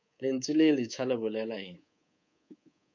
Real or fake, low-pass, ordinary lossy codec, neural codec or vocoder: fake; 7.2 kHz; MP3, 64 kbps; codec, 24 kHz, 3.1 kbps, DualCodec